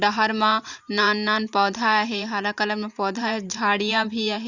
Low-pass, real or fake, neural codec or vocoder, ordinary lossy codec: 7.2 kHz; fake; vocoder, 44.1 kHz, 128 mel bands every 512 samples, BigVGAN v2; Opus, 64 kbps